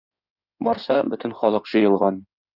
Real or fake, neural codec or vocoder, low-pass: fake; codec, 16 kHz in and 24 kHz out, 2.2 kbps, FireRedTTS-2 codec; 5.4 kHz